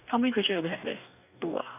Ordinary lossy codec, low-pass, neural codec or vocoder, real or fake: none; 3.6 kHz; codec, 44.1 kHz, 2.6 kbps, DAC; fake